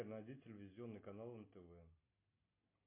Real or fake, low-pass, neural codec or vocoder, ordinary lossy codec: real; 3.6 kHz; none; MP3, 24 kbps